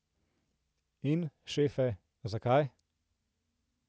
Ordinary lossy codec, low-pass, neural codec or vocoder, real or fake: none; none; none; real